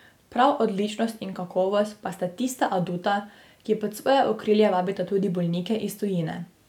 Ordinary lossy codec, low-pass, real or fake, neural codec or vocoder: none; 19.8 kHz; real; none